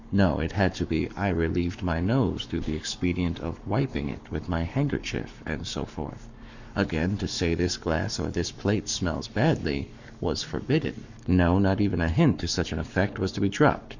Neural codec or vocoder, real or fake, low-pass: codec, 44.1 kHz, 7.8 kbps, Pupu-Codec; fake; 7.2 kHz